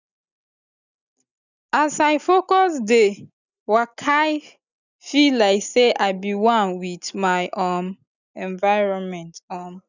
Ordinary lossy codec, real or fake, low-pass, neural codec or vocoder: none; real; 7.2 kHz; none